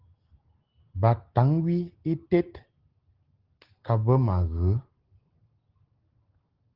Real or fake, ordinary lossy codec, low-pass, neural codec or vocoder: real; Opus, 16 kbps; 5.4 kHz; none